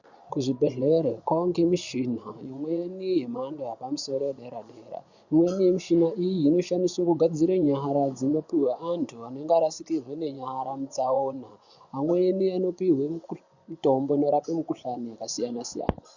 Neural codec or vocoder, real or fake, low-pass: vocoder, 24 kHz, 100 mel bands, Vocos; fake; 7.2 kHz